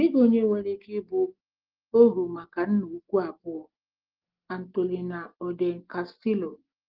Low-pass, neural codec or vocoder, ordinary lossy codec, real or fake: 5.4 kHz; none; Opus, 16 kbps; real